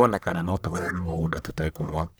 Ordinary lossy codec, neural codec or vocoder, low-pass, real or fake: none; codec, 44.1 kHz, 1.7 kbps, Pupu-Codec; none; fake